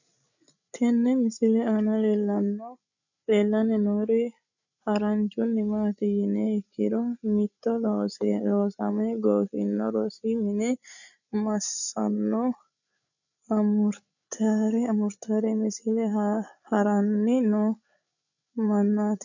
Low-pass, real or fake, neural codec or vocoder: 7.2 kHz; fake; codec, 16 kHz, 8 kbps, FreqCodec, larger model